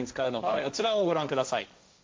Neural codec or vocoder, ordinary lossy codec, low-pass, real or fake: codec, 16 kHz, 1.1 kbps, Voila-Tokenizer; none; none; fake